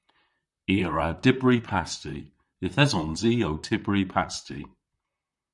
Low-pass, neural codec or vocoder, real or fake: 10.8 kHz; vocoder, 44.1 kHz, 128 mel bands, Pupu-Vocoder; fake